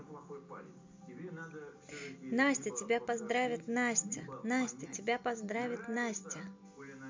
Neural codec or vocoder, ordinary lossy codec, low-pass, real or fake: none; MP3, 64 kbps; 7.2 kHz; real